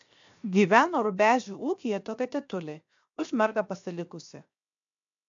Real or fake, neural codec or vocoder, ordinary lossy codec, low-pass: fake; codec, 16 kHz, 0.7 kbps, FocalCodec; AAC, 64 kbps; 7.2 kHz